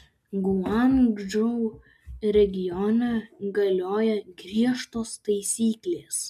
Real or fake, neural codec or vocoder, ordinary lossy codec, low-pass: real; none; MP3, 96 kbps; 14.4 kHz